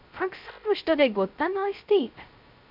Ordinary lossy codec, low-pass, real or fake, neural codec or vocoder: MP3, 48 kbps; 5.4 kHz; fake; codec, 16 kHz, 0.2 kbps, FocalCodec